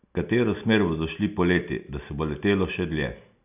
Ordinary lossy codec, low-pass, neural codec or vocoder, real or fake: none; 3.6 kHz; none; real